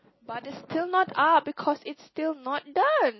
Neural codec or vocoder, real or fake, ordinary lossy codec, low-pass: none; real; MP3, 24 kbps; 7.2 kHz